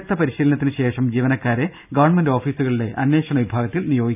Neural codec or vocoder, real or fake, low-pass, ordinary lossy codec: none; real; 3.6 kHz; none